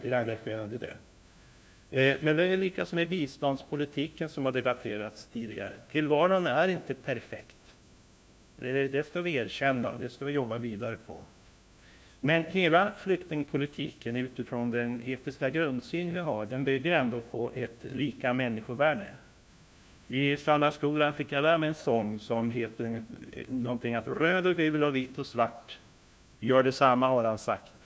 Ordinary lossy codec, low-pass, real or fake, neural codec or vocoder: none; none; fake; codec, 16 kHz, 1 kbps, FunCodec, trained on LibriTTS, 50 frames a second